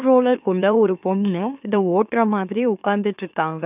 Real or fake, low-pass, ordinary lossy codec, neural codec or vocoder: fake; 3.6 kHz; none; autoencoder, 44.1 kHz, a latent of 192 numbers a frame, MeloTTS